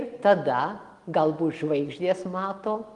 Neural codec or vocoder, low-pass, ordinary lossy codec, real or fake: none; 10.8 kHz; Opus, 24 kbps; real